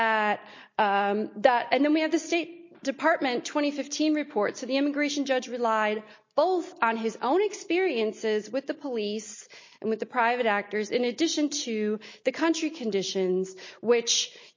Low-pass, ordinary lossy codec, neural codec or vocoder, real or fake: 7.2 kHz; MP3, 32 kbps; none; real